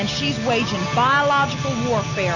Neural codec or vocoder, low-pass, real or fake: none; 7.2 kHz; real